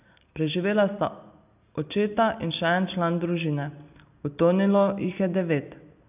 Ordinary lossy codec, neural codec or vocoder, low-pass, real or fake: none; none; 3.6 kHz; real